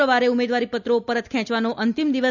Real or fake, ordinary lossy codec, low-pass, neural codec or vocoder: real; none; 7.2 kHz; none